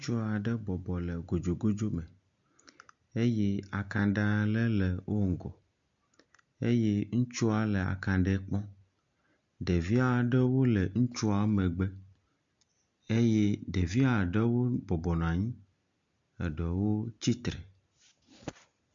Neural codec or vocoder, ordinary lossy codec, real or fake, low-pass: none; MP3, 64 kbps; real; 7.2 kHz